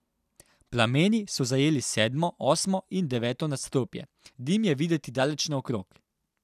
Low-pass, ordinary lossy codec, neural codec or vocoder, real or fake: 14.4 kHz; none; none; real